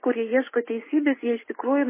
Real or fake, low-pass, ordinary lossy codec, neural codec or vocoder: real; 3.6 kHz; MP3, 16 kbps; none